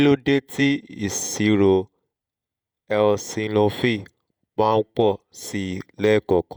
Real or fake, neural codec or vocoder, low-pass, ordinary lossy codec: fake; vocoder, 48 kHz, 128 mel bands, Vocos; none; none